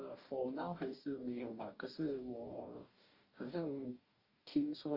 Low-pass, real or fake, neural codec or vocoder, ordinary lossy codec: 5.4 kHz; fake; codec, 44.1 kHz, 2.6 kbps, DAC; Opus, 32 kbps